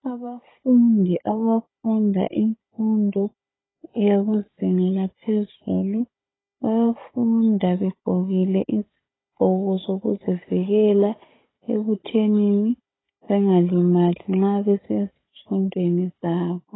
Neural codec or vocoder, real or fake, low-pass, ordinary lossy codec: codec, 16 kHz, 16 kbps, FunCodec, trained on Chinese and English, 50 frames a second; fake; 7.2 kHz; AAC, 16 kbps